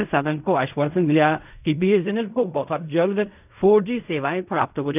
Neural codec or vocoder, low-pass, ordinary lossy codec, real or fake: codec, 16 kHz in and 24 kHz out, 0.4 kbps, LongCat-Audio-Codec, fine tuned four codebook decoder; 3.6 kHz; none; fake